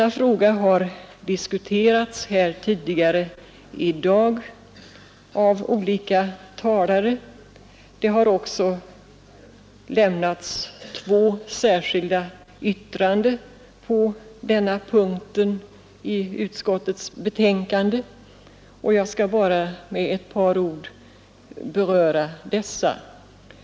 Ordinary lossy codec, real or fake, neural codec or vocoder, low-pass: none; real; none; none